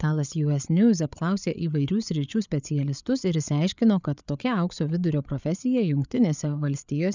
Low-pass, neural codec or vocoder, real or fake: 7.2 kHz; codec, 16 kHz, 8 kbps, FreqCodec, larger model; fake